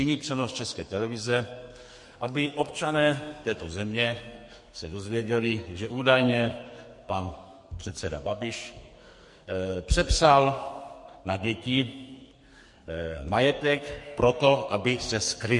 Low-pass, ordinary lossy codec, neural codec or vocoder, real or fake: 10.8 kHz; MP3, 48 kbps; codec, 44.1 kHz, 2.6 kbps, SNAC; fake